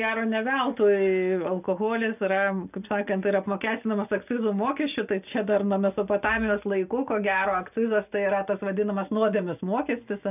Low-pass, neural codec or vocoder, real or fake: 3.6 kHz; none; real